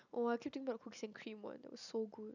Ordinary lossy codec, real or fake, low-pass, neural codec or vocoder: none; real; 7.2 kHz; none